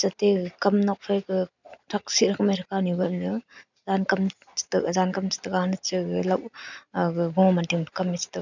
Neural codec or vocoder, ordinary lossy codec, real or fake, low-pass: none; none; real; 7.2 kHz